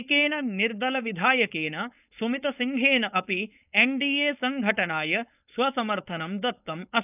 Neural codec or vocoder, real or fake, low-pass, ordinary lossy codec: codec, 16 kHz, 4.8 kbps, FACodec; fake; 3.6 kHz; none